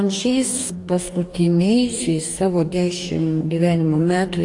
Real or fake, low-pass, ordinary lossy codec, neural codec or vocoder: fake; 10.8 kHz; AAC, 48 kbps; codec, 44.1 kHz, 2.6 kbps, DAC